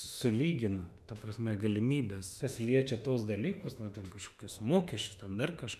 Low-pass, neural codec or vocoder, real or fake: 14.4 kHz; autoencoder, 48 kHz, 32 numbers a frame, DAC-VAE, trained on Japanese speech; fake